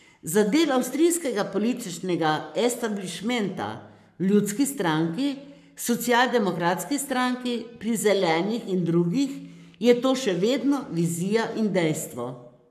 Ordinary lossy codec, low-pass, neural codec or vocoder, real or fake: none; 14.4 kHz; codec, 44.1 kHz, 7.8 kbps, Pupu-Codec; fake